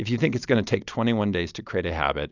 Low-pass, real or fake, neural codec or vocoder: 7.2 kHz; real; none